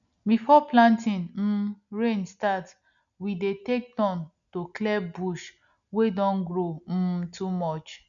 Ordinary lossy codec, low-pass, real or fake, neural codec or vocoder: none; 7.2 kHz; real; none